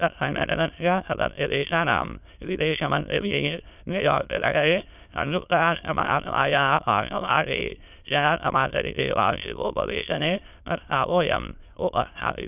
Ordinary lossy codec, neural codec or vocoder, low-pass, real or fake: none; autoencoder, 22.05 kHz, a latent of 192 numbers a frame, VITS, trained on many speakers; 3.6 kHz; fake